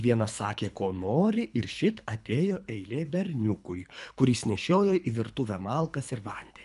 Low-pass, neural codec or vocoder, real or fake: 10.8 kHz; codec, 24 kHz, 3 kbps, HILCodec; fake